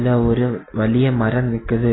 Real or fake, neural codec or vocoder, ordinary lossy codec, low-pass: real; none; AAC, 16 kbps; 7.2 kHz